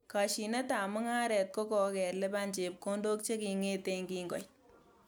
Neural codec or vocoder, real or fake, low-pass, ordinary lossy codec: none; real; none; none